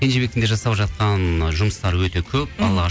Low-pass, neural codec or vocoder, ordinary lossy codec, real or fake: none; none; none; real